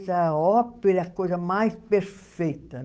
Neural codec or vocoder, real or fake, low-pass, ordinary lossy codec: codec, 16 kHz, 8 kbps, FunCodec, trained on Chinese and English, 25 frames a second; fake; none; none